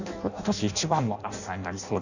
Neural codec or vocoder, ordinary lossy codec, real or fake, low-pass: codec, 16 kHz in and 24 kHz out, 0.6 kbps, FireRedTTS-2 codec; none; fake; 7.2 kHz